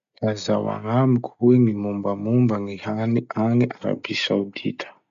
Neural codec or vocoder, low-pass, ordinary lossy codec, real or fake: none; 7.2 kHz; none; real